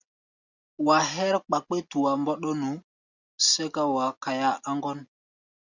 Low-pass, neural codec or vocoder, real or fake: 7.2 kHz; none; real